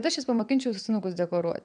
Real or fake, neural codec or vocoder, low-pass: fake; vocoder, 22.05 kHz, 80 mel bands, WaveNeXt; 9.9 kHz